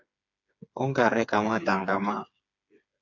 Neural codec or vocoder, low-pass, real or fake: codec, 16 kHz, 4 kbps, FreqCodec, smaller model; 7.2 kHz; fake